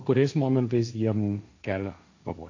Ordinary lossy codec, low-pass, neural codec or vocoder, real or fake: AAC, 48 kbps; 7.2 kHz; codec, 16 kHz, 1.1 kbps, Voila-Tokenizer; fake